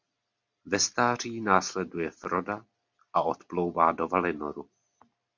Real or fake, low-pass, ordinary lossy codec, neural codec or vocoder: real; 7.2 kHz; MP3, 64 kbps; none